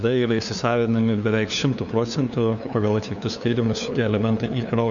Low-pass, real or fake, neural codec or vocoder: 7.2 kHz; fake; codec, 16 kHz, 2 kbps, FunCodec, trained on LibriTTS, 25 frames a second